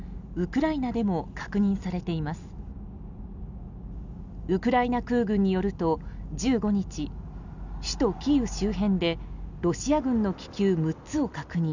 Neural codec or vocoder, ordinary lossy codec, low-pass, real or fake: none; none; 7.2 kHz; real